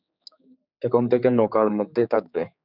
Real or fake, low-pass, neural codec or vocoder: fake; 5.4 kHz; codec, 16 kHz, 4 kbps, X-Codec, HuBERT features, trained on general audio